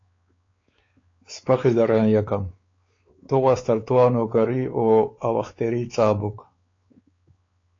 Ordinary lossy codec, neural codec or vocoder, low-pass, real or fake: AAC, 32 kbps; codec, 16 kHz, 4 kbps, X-Codec, WavLM features, trained on Multilingual LibriSpeech; 7.2 kHz; fake